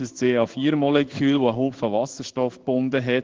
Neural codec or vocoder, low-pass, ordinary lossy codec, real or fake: codec, 16 kHz in and 24 kHz out, 1 kbps, XY-Tokenizer; 7.2 kHz; Opus, 16 kbps; fake